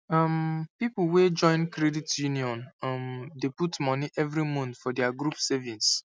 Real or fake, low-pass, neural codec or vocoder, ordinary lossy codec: real; none; none; none